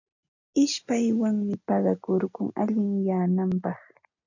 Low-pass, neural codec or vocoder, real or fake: 7.2 kHz; none; real